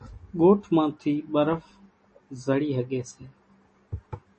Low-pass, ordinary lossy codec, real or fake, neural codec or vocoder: 10.8 kHz; MP3, 32 kbps; real; none